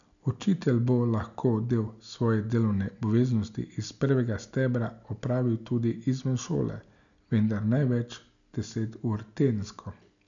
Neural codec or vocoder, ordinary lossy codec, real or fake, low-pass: none; none; real; 7.2 kHz